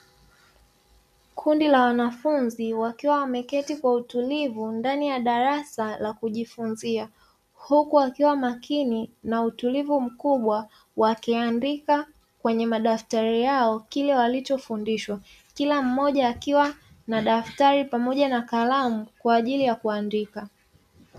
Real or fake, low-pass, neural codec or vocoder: real; 14.4 kHz; none